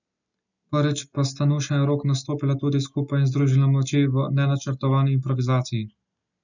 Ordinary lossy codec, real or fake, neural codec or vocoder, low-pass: none; real; none; 7.2 kHz